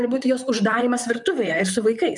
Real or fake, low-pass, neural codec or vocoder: fake; 10.8 kHz; vocoder, 44.1 kHz, 128 mel bands, Pupu-Vocoder